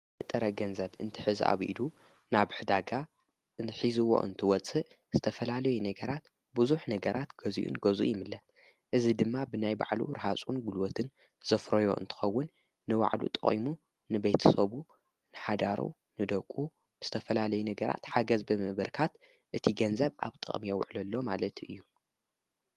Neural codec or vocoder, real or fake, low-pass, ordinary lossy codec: none; real; 14.4 kHz; Opus, 24 kbps